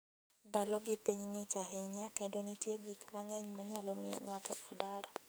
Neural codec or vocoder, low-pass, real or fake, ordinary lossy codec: codec, 44.1 kHz, 2.6 kbps, SNAC; none; fake; none